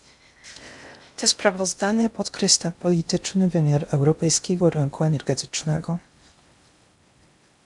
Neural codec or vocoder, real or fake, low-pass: codec, 16 kHz in and 24 kHz out, 0.6 kbps, FocalCodec, streaming, 2048 codes; fake; 10.8 kHz